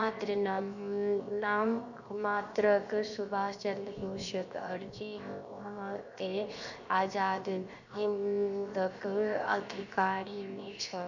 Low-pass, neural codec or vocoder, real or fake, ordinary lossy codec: 7.2 kHz; codec, 16 kHz, 0.7 kbps, FocalCodec; fake; none